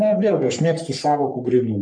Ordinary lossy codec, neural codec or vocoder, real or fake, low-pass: MP3, 64 kbps; codec, 44.1 kHz, 3.4 kbps, Pupu-Codec; fake; 9.9 kHz